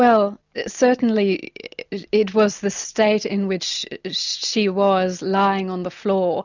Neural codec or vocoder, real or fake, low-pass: none; real; 7.2 kHz